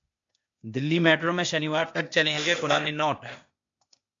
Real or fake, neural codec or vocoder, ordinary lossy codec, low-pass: fake; codec, 16 kHz, 0.8 kbps, ZipCodec; MP3, 48 kbps; 7.2 kHz